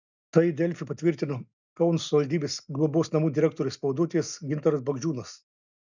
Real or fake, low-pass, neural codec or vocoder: real; 7.2 kHz; none